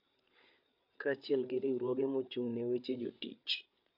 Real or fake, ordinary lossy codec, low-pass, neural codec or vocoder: fake; MP3, 48 kbps; 5.4 kHz; codec, 16 kHz, 8 kbps, FreqCodec, larger model